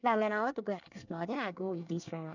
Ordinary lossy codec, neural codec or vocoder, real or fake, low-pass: none; codec, 24 kHz, 1 kbps, SNAC; fake; 7.2 kHz